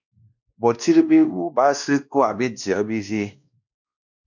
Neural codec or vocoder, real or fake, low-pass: codec, 16 kHz, 1 kbps, X-Codec, WavLM features, trained on Multilingual LibriSpeech; fake; 7.2 kHz